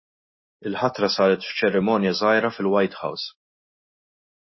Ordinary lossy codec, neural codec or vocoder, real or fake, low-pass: MP3, 24 kbps; none; real; 7.2 kHz